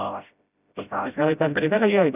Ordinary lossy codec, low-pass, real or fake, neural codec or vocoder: none; 3.6 kHz; fake; codec, 16 kHz, 0.5 kbps, FreqCodec, smaller model